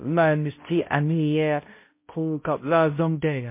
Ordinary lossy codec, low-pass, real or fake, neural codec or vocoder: MP3, 24 kbps; 3.6 kHz; fake; codec, 16 kHz, 0.5 kbps, X-Codec, HuBERT features, trained on balanced general audio